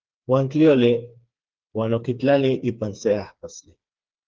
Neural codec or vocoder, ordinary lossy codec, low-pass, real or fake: codec, 16 kHz, 4 kbps, FreqCodec, smaller model; Opus, 32 kbps; 7.2 kHz; fake